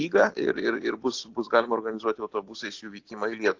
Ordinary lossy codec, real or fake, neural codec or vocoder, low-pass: AAC, 48 kbps; real; none; 7.2 kHz